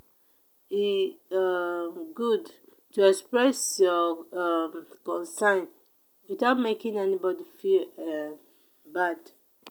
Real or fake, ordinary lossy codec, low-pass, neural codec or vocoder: real; none; none; none